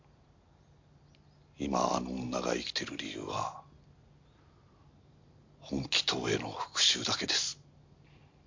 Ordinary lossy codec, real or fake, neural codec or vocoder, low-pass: MP3, 48 kbps; real; none; 7.2 kHz